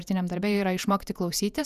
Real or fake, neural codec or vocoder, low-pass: fake; vocoder, 48 kHz, 128 mel bands, Vocos; 14.4 kHz